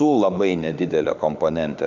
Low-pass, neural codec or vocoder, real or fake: 7.2 kHz; autoencoder, 48 kHz, 32 numbers a frame, DAC-VAE, trained on Japanese speech; fake